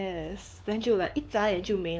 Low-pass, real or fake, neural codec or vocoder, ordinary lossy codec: none; fake; codec, 16 kHz, 4 kbps, X-Codec, WavLM features, trained on Multilingual LibriSpeech; none